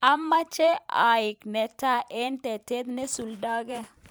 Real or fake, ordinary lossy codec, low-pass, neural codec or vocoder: fake; none; none; vocoder, 44.1 kHz, 128 mel bands every 512 samples, BigVGAN v2